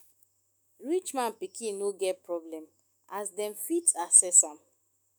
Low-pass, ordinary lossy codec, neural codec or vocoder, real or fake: none; none; autoencoder, 48 kHz, 128 numbers a frame, DAC-VAE, trained on Japanese speech; fake